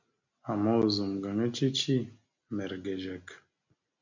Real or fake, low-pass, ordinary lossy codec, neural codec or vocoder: real; 7.2 kHz; MP3, 64 kbps; none